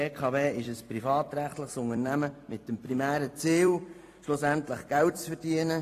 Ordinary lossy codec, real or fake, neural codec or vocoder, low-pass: AAC, 48 kbps; fake; vocoder, 44.1 kHz, 128 mel bands every 256 samples, BigVGAN v2; 14.4 kHz